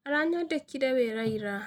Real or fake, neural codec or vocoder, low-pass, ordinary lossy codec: real; none; 19.8 kHz; none